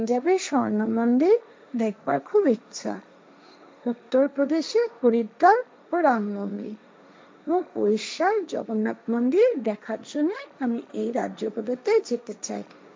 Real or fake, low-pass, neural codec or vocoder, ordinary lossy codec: fake; none; codec, 16 kHz, 1.1 kbps, Voila-Tokenizer; none